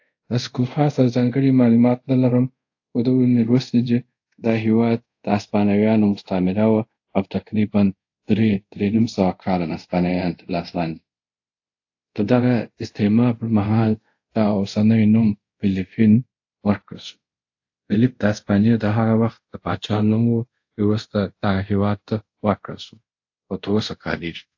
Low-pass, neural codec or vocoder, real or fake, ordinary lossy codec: 7.2 kHz; codec, 24 kHz, 0.5 kbps, DualCodec; fake; AAC, 48 kbps